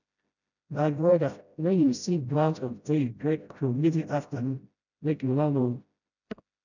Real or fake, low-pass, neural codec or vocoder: fake; 7.2 kHz; codec, 16 kHz, 0.5 kbps, FreqCodec, smaller model